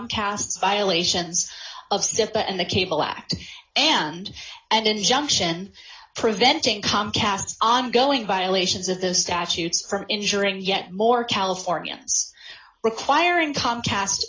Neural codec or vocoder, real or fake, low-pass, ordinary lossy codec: none; real; 7.2 kHz; AAC, 32 kbps